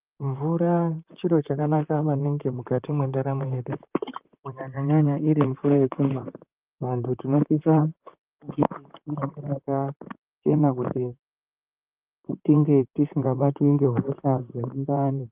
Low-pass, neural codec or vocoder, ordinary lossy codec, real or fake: 3.6 kHz; vocoder, 44.1 kHz, 128 mel bands, Pupu-Vocoder; Opus, 24 kbps; fake